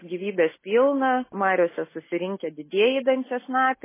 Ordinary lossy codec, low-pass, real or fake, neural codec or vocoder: MP3, 16 kbps; 3.6 kHz; real; none